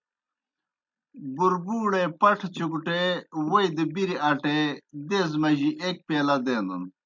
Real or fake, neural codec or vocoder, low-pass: real; none; 7.2 kHz